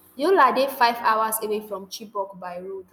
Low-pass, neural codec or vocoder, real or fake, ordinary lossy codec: none; none; real; none